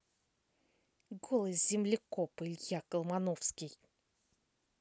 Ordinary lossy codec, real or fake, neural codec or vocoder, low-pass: none; real; none; none